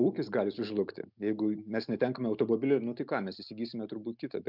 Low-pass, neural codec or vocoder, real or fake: 5.4 kHz; none; real